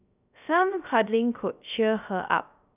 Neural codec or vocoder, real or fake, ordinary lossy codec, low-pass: codec, 16 kHz, 0.3 kbps, FocalCodec; fake; none; 3.6 kHz